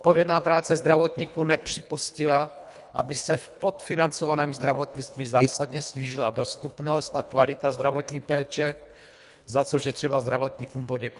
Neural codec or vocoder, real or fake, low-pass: codec, 24 kHz, 1.5 kbps, HILCodec; fake; 10.8 kHz